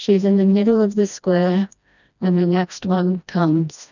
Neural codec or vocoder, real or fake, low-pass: codec, 16 kHz, 1 kbps, FreqCodec, smaller model; fake; 7.2 kHz